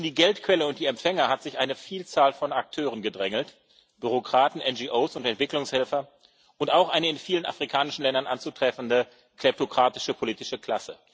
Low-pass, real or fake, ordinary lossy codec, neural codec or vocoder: none; real; none; none